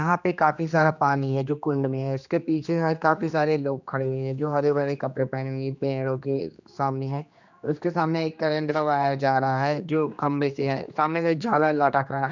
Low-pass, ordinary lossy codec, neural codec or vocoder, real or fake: 7.2 kHz; none; codec, 16 kHz, 2 kbps, X-Codec, HuBERT features, trained on general audio; fake